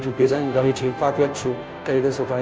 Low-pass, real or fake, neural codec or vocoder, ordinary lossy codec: none; fake; codec, 16 kHz, 0.5 kbps, FunCodec, trained on Chinese and English, 25 frames a second; none